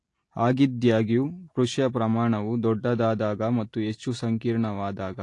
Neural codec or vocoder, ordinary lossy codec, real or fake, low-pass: none; AAC, 48 kbps; real; 10.8 kHz